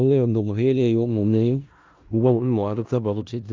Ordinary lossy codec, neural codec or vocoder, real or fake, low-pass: Opus, 24 kbps; codec, 16 kHz in and 24 kHz out, 0.4 kbps, LongCat-Audio-Codec, four codebook decoder; fake; 7.2 kHz